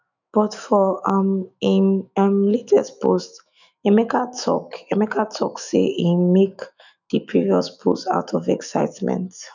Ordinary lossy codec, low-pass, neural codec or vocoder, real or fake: none; 7.2 kHz; autoencoder, 48 kHz, 128 numbers a frame, DAC-VAE, trained on Japanese speech; fake